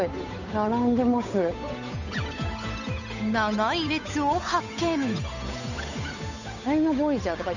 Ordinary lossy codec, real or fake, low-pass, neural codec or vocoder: none; fake; 7.2 kHz; codec, 16 kHz, 8 kbps, FunCodec, trained on Chinese and English, 25 frames a second